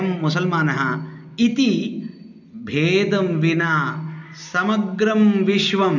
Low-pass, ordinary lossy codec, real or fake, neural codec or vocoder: 7.2 kHz; none; real; none